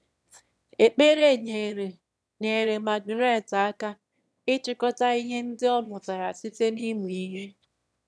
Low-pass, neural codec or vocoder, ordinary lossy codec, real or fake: none; autoencoder, 22.05 kHz, a latent of 192 numbers a frame, VITS, trained on one speaker; none; fake